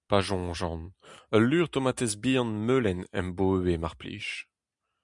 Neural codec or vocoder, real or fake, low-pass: none; real; 10.8 kHz